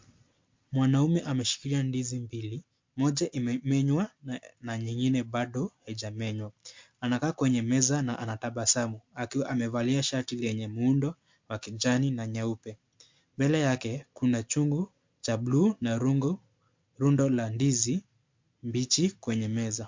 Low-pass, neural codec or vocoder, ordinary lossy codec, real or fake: 7.2 kHz; none; MP3, 48 kbps; real